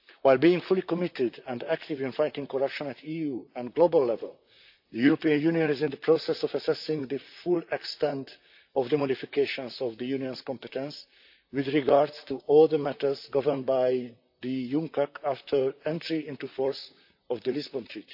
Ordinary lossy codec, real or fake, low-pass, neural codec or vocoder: AAC, 48 kbps; fake; 5.4 kHz; vocoder, 44.1 kHz, 128 mel bands, Pupu-Vocoder